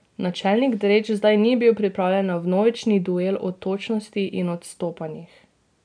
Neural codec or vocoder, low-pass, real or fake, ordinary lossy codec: none; 9.9 kHz; real; none